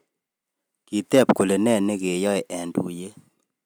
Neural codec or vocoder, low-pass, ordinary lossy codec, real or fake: none; none; none; real